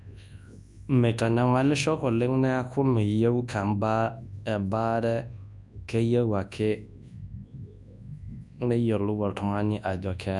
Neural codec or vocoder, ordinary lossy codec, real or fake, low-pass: codec, 24 kHz, 0.9 kbps, WavTokenizer, large speech release; none; fake; 10.8 kHz